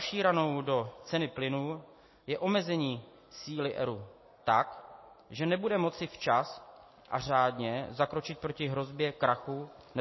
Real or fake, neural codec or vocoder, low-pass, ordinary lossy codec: real; none; 7.2 kHz; MP3, 24 kbps